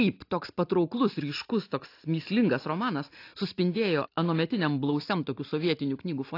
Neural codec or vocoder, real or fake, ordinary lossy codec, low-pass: none; real; AAC, 32 kbps; 5.4 kHz